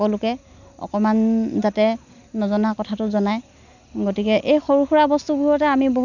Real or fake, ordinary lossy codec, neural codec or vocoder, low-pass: real; none; none; 7.2 kHz